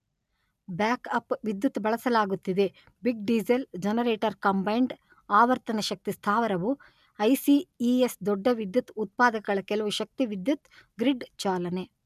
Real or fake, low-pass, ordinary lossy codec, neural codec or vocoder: real; 14.4 kHz; none; none